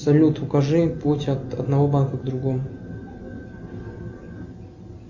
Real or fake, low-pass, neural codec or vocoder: real; 7.2 kHz; none